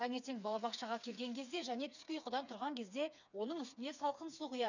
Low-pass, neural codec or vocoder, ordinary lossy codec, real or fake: 7.2 kHz; codec, 16 kHz, 4 kbps, FreqCodec, smaller model; none; fake